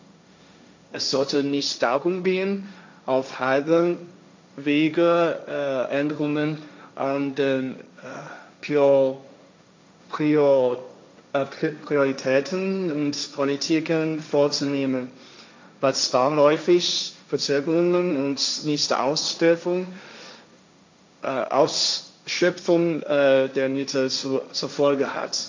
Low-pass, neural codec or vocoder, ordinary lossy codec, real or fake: 7.2 kHz; codec, 16 kHz, 1.1 kbps, Voila-Tokenizer; MP3, 64 kbps; fake